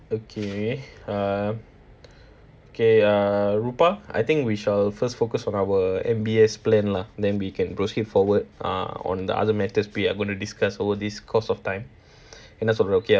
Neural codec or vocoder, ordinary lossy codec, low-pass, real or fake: none; none; none; real